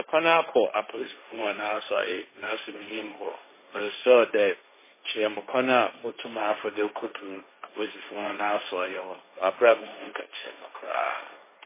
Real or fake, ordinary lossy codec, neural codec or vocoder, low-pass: fake; MP3, 16 kbps; codec, 16 kHz, 1.1 kbps, Voila-Tokenizer; 3.6 kHz